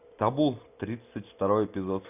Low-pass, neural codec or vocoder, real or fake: 3.6 kHz; none; real